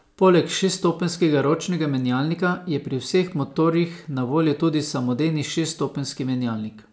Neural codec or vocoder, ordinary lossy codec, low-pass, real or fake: none; none; none; real